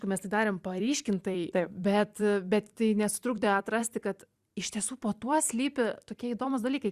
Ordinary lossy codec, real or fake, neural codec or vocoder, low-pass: Opus, 64 kbps; fake; vocoder, 44.1 kHz, 128 mel bands every 256 samples, BigVGAN v2; 14.4 kHz